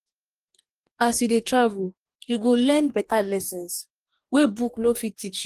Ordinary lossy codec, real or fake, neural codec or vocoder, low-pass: Opus, 24 kbps; fake; codec, 44.1 kHz, 2.6 kbps, DAC; 14.4 kHz